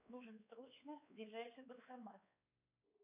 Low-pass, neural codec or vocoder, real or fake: 3.6 kHz; codec, 16 kHz, 4 kbps, X-Codec, WavLM features, trained on Multilingual LibriSpeech; fake